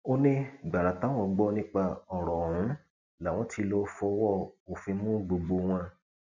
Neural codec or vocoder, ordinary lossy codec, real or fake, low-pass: none; none; real; 7.2 kHz